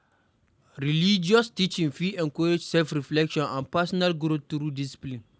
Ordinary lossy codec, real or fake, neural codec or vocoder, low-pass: none; real; none; none